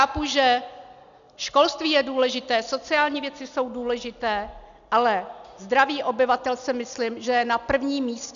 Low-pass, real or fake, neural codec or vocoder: 7.2 kHz; real; none